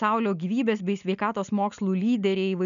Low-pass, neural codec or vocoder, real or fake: 7.2 kHz; none; real